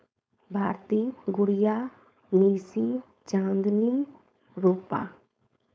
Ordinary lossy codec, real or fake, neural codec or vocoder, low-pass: none; fake; codec, 16 kHz, 4.8 kbps, FACodec; none